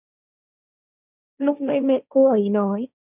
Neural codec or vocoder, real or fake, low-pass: codec, 16 kHz, 1.1 kbps, Voila-Tokenizer; fake; 3.6 kHz